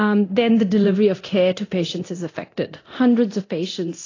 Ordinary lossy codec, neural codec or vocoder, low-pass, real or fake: AAC, 32 kbps; codec, 24 kHz, 0.9 kbps, DualCodec; 7.2 kHz; fake